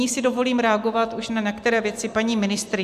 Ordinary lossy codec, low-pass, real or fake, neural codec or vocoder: MP3, 96 kbps; 14.4 kHz; real; none